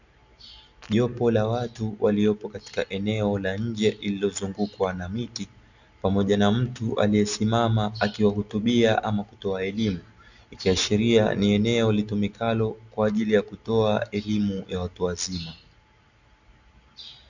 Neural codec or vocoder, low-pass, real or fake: none; 7.2 kHz; real